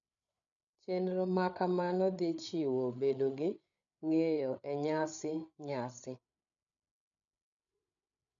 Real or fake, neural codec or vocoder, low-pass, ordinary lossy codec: fake; codec, 16 kHz, 8 kbps, FreqCodec, larger model; 7.2 kHz; none